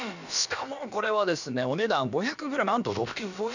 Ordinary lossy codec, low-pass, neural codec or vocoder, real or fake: none; 7.2 kHz; codec, 16 kHz, about 1 kbps, DyCAST, with the encoder's durations; fake